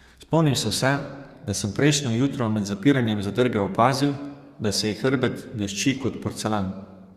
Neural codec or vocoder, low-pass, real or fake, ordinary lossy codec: codec, 32 kHz, 1.9 kbps, SNAC; 14.4 kHz; fake; Opus, 64 kbps